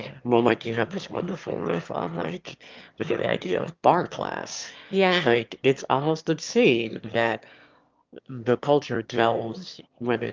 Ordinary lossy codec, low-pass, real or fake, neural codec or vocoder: Opus, 32 kbps; 7.2 kHz; fake; autoencoder, 22.05 kHz, a latent of 192 numbers a frame, VITS, trained on one speaker